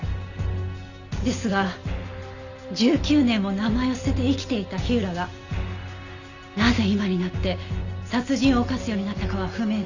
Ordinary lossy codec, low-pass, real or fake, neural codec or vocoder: Opus, 64 kbps; 7.2 kHz; real; none